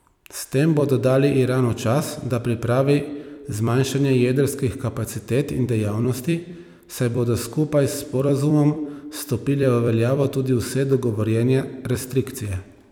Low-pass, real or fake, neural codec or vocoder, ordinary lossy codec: 19.8 kHz; fake; vocoder, 44.1 kHz, 128 mel bands every 256 samples, BigVGAN v2; none